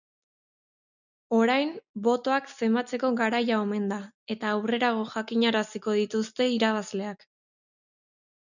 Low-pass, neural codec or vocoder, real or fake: 7.2 kHz; none; real